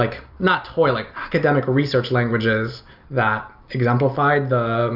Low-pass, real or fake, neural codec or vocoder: 5.4 kHz; real; none